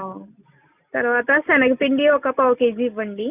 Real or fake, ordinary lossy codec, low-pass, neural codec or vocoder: real; none; 3.6 kHz; none